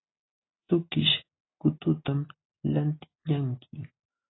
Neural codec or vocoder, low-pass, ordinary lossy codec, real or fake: none; 7.2 kHz; AAC, 16 kbps; real